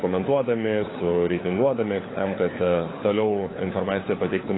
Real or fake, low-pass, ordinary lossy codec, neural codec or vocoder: fake; 7.2 kHz; AAC, 16 kbps; codec, 16 kHz, 4 kbps, FunCodec, trained on LibriTTS, 50 frames a second